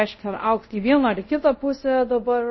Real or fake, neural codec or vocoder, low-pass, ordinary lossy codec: fake; codec, 24 kHz, 0.5 kbps, DualCodec; 7.2 kHz; MP3, 24 kbps